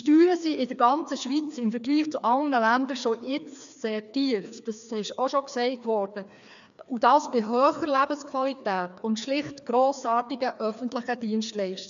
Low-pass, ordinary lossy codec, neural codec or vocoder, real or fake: 7.2 kHz; none; codec, 16 kHz, 2 kbps, FreqCodec, larger model; fake